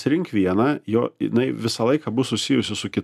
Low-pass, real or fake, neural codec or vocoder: 14.4 kHz; real; none